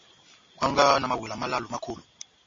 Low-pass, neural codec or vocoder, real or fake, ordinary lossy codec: 7.2 kHz; none; real; MP3, 32 kbps